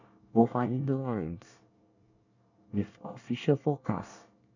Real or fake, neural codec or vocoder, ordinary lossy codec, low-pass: fake; codec, 24 kHz, 1 kbps, SNAC; none; 7.2 kHz